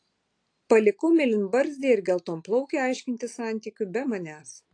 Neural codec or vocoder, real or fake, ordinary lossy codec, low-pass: none; real; AAC, 48 kbps; 9.9 kHz